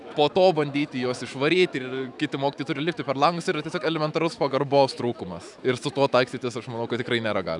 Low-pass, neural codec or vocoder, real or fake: 10.8 kHz; autoencoder, 48 kHz, 128 numbers a frame, DAC-VAE, trained on Japanese speech; fake